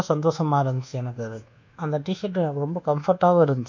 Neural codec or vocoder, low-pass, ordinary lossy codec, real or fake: codec, 24 kHz, 1.2 kbps, DualCodec; 7.2 kHz; none; fake